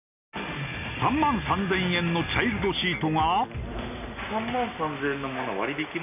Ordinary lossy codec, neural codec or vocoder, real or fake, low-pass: none; none; real; 3.6 kHz